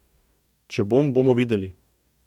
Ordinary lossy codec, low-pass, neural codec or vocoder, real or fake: none; 19.8 kHz; codec, 44.1 kHz, 2.6 kbps, DAC; fake